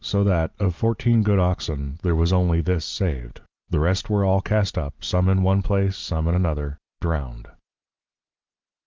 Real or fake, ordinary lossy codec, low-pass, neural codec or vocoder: real; Opus, 32 kbps; 7.2 kHz; none